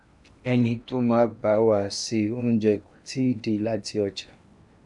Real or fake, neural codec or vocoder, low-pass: fake; codec, 16 kHz in and 24 kHz out, 0.8 kbps, FocalCodec, streaming, 65536 codes; 10.8 kHz